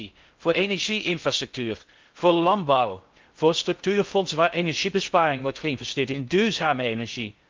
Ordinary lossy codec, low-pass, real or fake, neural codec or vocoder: Opus, 24 kbps; 7.2 kHz; fake; codec, 16 kHz in and 24 kHz out, 0.6 kbps, FocalCodec, streaming, 4096 codes